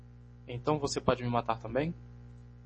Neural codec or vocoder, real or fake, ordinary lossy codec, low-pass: none; real; MP3, 32 kbps; 10.8 kHz